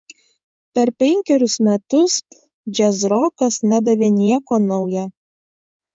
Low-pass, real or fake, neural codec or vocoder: 9.9 kHz; fake; codec, 16 kHz in and 24 kHz out, 2.2 kbps, FireRedTTS-2 codec